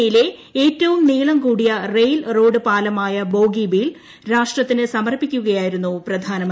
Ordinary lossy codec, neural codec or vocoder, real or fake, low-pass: none; none; real; none